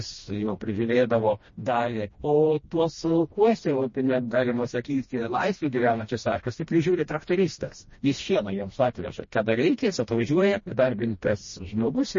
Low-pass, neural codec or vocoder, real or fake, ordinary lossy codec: 7.2 kHz; codec, 16 kHz, 1 kbps, FreqCodec, smaller model; fake; MP3, 32 kbps